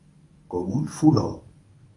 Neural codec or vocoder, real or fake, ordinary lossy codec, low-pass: codec, 24 kHz, 0.9 kbps, WavTokenizer, medium speech release version 1; fake; AAC, 32 kbps; 10.8 kHz